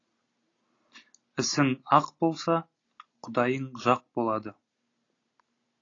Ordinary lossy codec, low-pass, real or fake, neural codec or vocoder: AAC, 32 kbps; 7.2 kHz; real; none